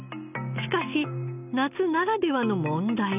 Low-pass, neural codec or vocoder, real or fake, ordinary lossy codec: 3.6 kHz; none; real; none